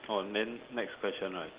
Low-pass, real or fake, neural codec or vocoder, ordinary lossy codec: 3.6 kHz; real; none; Opus, 16 kbps